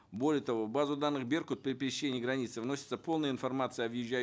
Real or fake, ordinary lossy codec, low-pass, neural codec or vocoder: real; none; none; none